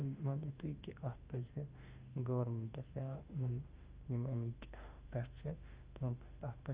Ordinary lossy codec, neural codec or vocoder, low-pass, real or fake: Opus, 24 kbps; autoencoder, 48 kHz, 32 numbers a frame, DAC-VAE, trained on Japanese speech; 3.6 kHz; fake